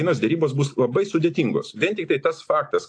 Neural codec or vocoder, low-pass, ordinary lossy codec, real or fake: none; 9.9 kHz; AAC, 48 kbps; real